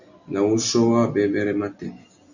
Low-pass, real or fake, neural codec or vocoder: 7.2 kHz; real; none